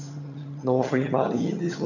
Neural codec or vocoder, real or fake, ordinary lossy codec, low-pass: vocoder, 22.05 kHz, 80 mel bands, HiFi-GAN; fake; none; 7.2 kHz